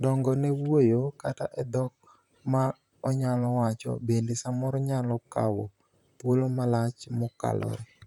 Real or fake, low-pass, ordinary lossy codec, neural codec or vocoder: fake; 19.8 kHz; none; vocoder, 44.1 kHz, 128 mel bands, Pupu-Vocoder